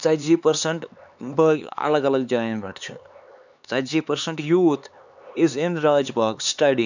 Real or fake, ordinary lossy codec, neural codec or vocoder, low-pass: fake; none; codec, 16 kHz, 4 kbps, X-Codec, HuBERT features, trained on LibriSpeech; 7.2 kHz